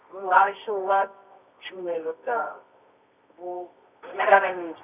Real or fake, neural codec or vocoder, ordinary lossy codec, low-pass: fake; codec, 24 kHz, 0.9 kbps, WavTokenizer, medium music audio release; none; 3.6 kHz